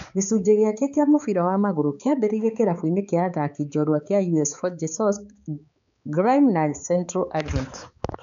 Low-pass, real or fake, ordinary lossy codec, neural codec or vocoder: 7.2 kHz; fake; none; codec, 16 kHz, 4 kbps, X-Codec, HuBERT features, trained on balanced general audio